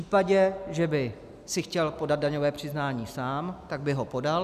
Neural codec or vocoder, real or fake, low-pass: none; real; 14.4 kHz